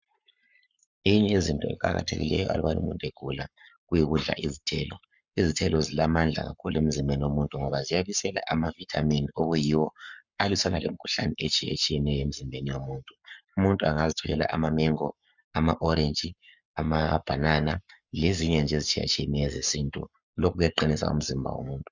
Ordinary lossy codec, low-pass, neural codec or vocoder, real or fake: Opus, 64 kbps; 7.2 kHz; codec, 44.1 kHz, 7.8 kbps, Pupu-Codec; fake